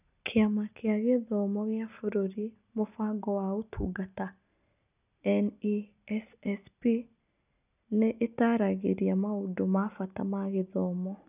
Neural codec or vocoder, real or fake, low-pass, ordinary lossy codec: none; real; 3.6 kHz; none